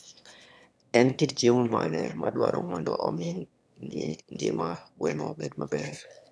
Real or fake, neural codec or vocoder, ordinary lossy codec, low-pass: fake; autoencoder, 22.05 kHz, a latent of 192 numbers a frame, VITS, trained on one speaker; none; none